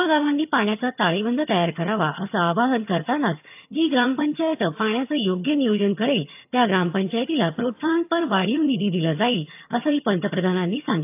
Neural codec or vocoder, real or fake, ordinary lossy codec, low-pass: vocoder, 22.05 kHz, 80 mel bands, HiFi-GAN; fake; AAC, 32 kbps; 3.6 kHz